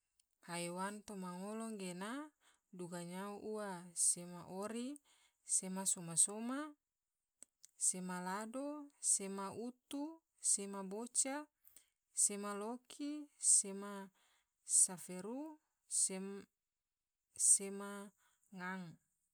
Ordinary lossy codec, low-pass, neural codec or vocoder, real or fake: none; none; none; real